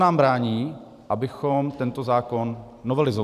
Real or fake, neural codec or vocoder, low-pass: fake; vocoder, 44.1 kHz, 128 mel bands every 512 samples, BigVGAN v2; 14.4 kHz